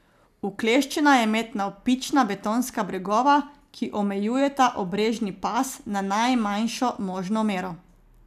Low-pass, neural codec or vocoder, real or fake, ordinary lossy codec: 14.4 kHz; none; real; none